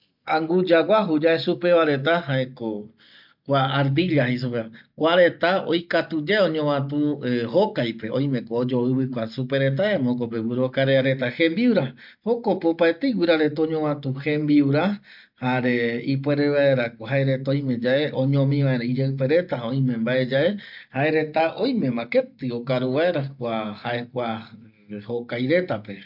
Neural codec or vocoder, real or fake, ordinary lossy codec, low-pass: none; real; MP3, 48 kbps; 5.4 kHz